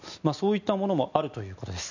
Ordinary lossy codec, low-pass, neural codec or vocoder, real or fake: none; 7.2 kHz; none; real